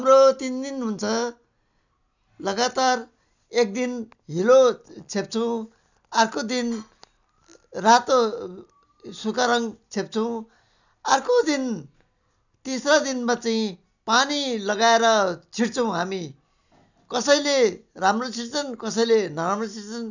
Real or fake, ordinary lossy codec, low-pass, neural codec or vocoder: real; none; 7.2 kHz; none